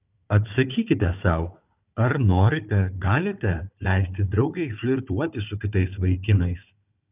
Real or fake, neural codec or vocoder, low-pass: fake; codec, 16 kHz, 4 kbps, FunCodec, trained on Chinese and English, 50 frames a second; 3.6 kHz